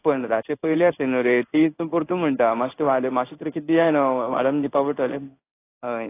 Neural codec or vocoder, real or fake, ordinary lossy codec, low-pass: codec, 16 kHz in and 24 kHz out, 1 kbps, XY-Tokenizer; fake; AAC, 24 kbps; 3.6 kHz